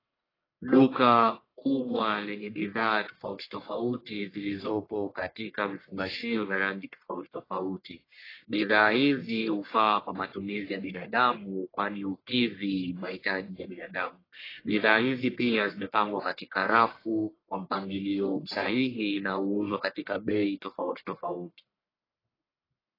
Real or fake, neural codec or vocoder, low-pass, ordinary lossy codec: fake; codec, 44.1 kHz, 1.7 kbps, Pupu-Codec; 5.4 kHz; AAC, 24 kbps